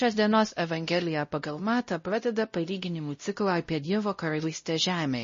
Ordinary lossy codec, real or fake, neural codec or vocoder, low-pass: MP3, 32 kbps; fake; codec, 16 kHz, 0.5 kbps, X-Codec, WavLM features, trained on Multilingual LibriSpeech; 7.2 kHz